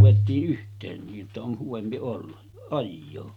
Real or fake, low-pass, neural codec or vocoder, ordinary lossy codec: real; 19.8 kHz; none; none